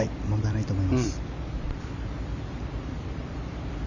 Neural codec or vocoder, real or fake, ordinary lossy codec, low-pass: vocoder, 44.1 kHz, 128 mel bands every 256 samples, BigVGAN v2; fake; none; 7.2 kHz